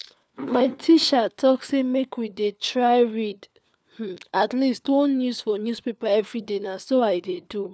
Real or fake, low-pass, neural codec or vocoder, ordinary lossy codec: fake; none; codec, 16 kHz, 4 kbps, FunCodec, trained on LibriTTS, 50 frames a second; none